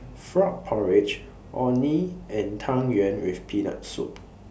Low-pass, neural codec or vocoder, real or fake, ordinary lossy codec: none; none; real; none